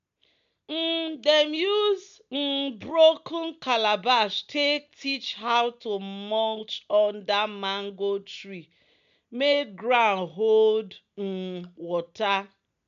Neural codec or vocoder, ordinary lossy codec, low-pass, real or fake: none; MP3, 64 kbps; 7.2 kHz; real